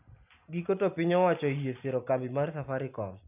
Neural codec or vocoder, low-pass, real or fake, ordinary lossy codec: none; 3.6 kHz; real; none